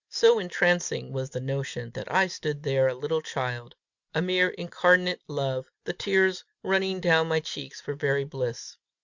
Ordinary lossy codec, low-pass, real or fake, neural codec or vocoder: Opus, 64 kbps; 7.2 kHz; real; none